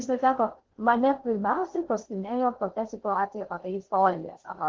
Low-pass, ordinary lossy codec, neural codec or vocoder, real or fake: 7.2 kHz; Opus, 16 kbps; codec, 16 kHz in and 24 kHz out, 0.6 kbps, FocalCodec, streaming, 4096 codes; fake